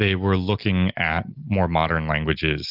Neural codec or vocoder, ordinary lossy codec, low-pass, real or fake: none; Opus, 32 kbps; 5.4 kHz; real